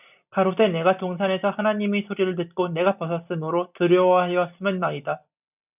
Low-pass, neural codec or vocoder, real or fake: 3.6 kHz; none; real